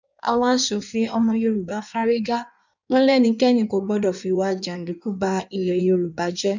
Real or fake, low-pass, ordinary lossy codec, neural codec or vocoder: fake; 7.2 kHz; none; codec, 16 kHz in and 24 kHz out, 1.1 kbps, FireRedTTS-2 codec